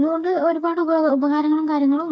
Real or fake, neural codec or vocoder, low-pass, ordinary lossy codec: fake; codec, 16 kHz, 4 kbps, FreqCodec, smaller model; none; none